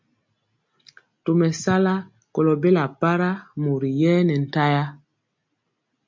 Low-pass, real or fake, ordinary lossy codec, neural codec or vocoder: 7.2 kHz; real; MP3, 48 kbps; none